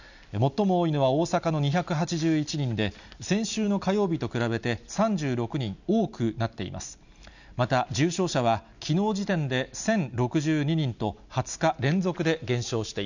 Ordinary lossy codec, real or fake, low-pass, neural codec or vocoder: none; real; 7.2 kHz; none